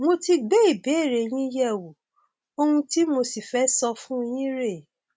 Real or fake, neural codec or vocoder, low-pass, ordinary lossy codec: real; none; none; none